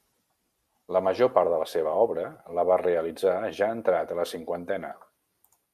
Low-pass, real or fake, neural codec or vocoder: 14.4 kHz; real; none